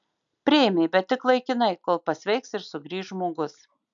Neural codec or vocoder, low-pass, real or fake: none; 7.2 kHz; real